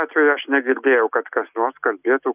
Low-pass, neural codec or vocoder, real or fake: 3.6 kHz; none; real